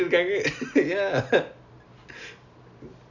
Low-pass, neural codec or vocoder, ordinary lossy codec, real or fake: 7.2 kHz; none; none; real